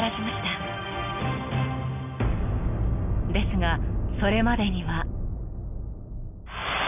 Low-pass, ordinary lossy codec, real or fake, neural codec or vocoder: 3.6 kHz; none; real; none